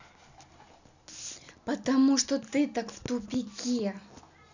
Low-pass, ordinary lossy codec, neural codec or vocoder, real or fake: 7.2 kHz; none; none; real